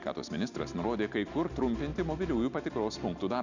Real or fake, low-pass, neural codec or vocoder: real; 7.2 kHz; none